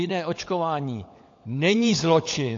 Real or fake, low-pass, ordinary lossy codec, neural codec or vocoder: fake; 7.2 kHz; AAC, 48 kbps; codec, 16 kHz, 16 kbps, FunCodec, trained on LibriTTS, 50 frames a second